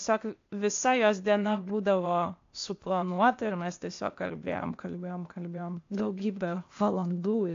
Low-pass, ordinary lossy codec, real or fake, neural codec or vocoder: 7.2 kHz; AAC, 48 kbps; fake; codec, 16 kHz, 0.8 kbps, ZipCodec